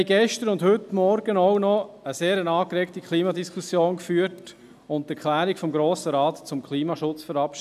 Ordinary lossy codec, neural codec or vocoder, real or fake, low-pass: none; none; real; 14.4 kHz